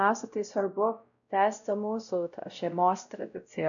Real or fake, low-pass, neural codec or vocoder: fake; 7.2 kHz; codec, 16 kHz, 0.5 kbps, X-Codec, WavLM features, trained on Multilingual LibriSpeech